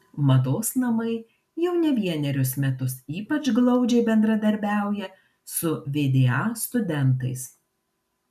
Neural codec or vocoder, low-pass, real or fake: none; 14.4 kHz; real